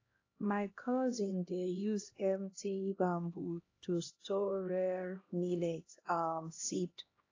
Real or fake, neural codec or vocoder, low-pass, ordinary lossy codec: fake; codec, 16 kHz, 1 kbps, X-Codec, HuBERT features, trained on LibriSpeech; 7.2 kHz; AAC, 32 kbps